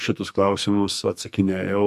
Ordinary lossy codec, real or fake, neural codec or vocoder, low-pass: MP3, 96 kbps; fake; codec, 44.1 kHz, 2.6 kbps, SNAC; 14.4 kHz